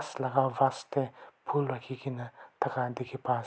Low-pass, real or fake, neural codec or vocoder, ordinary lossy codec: none; real; none; none